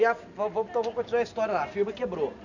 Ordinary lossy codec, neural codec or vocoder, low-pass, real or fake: none; none; 7.2 kHz; real